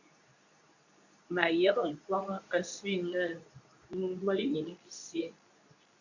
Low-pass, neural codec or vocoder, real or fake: 7.2 kHz; codec, 24 kHz, 0.9 kbps, WavTokenizer, medium speech release version 2; fake